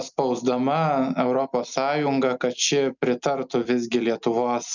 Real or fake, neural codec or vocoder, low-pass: real; none; 7.2 kHz